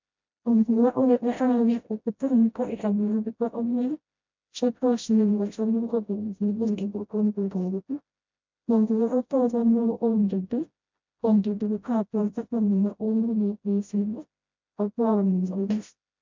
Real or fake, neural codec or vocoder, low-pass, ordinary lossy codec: fake; codec, 16 kHz, 0.5 kbps, FreqCodec, smaller model; 7.2 kHz; MP3, 64 kbps